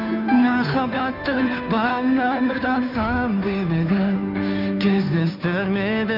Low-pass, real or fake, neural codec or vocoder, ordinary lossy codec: 5.4 kHz; fake; codec, 16 kHz, 2 kbps, FunCodec, trained on Chinese and English, 25 frames a second; none